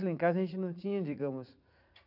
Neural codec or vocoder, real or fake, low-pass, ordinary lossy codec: vocoder, 44.1 kHz, 80 mel bands, Vocos; fake; 5.4 kHz; none